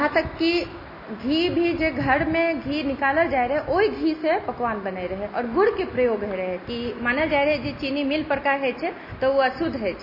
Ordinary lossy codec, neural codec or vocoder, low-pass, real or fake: MP3, 24 kbps; none; 5.4 kHz; real